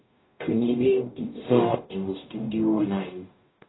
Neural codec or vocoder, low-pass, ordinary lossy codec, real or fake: codec, 44.1 kHz, 0.9 kbps, DAC; 7.2 kHz; AAC, 16 kbps; fake